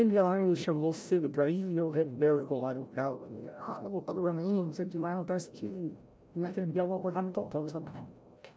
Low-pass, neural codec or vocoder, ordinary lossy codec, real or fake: none; codec, 16 kHz, 0.5 kbps, FreqCodec, larger model; none; fake